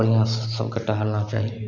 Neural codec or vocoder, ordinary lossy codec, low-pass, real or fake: none; none; 7.2 kHz; real